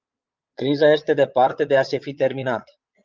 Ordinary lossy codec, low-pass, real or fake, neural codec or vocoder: Opus, 24 kbps; 7.2 kHz; fake; vocoder, 22.05 kHz, 80 mel bands, Vocos